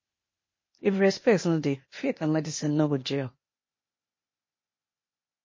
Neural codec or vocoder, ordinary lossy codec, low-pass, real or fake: codec, 16 kHz, 0.8 kbps, ZipCodec; MP3, 32 kbps; 7.2 kHz; fake